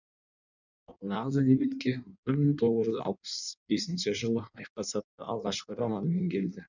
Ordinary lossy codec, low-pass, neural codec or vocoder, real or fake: none; 7.2 kHz; codec, 16 kHz in and 24 kHz out, 1.1 kbps, FireRedTTS-2 codec; fake